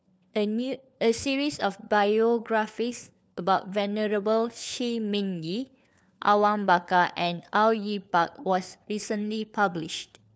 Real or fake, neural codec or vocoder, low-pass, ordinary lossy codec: fake; codec, 16 kHz, 4 kbps, FunCodec, trained on LibriTTS, 50 frames a second; none; none